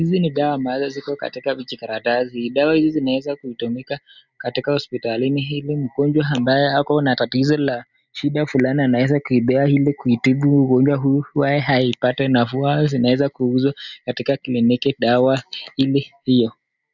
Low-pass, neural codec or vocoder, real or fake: 7.2 kHz; none; real